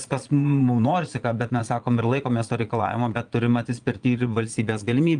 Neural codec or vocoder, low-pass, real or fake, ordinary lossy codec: vocoder, 22.05 kHz, 80 mel bands, Vocos; 9.9 kHz; fake; Opus, 32 kbps